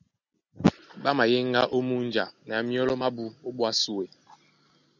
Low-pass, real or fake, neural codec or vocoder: 7.2 kHz; real; none